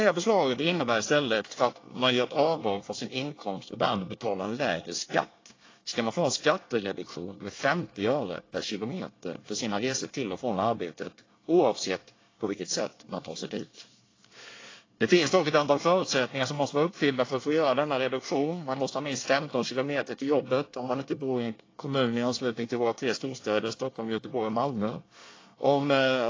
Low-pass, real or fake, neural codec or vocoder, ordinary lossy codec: 7.2 kHz; fake; codec, 24 kHz, 1 kbps, SNAC; AAC, 32 kbps